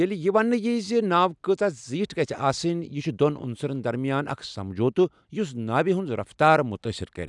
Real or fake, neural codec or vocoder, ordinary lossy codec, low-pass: real; none; none; 10.8 kHz